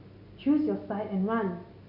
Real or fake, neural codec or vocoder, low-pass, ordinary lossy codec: real; none; 5.4 kHz; none